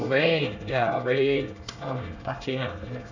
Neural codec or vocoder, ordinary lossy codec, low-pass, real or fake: codec, 24 kHz, 1 kbps, SNAC; none; 7.2 kHz; fake